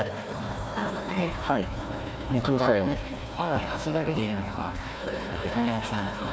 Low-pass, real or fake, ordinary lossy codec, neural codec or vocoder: none; fake; none; codec, 16 kHz, 1 kbps, FunCodec, trained on Chinese and English, 50 frames a second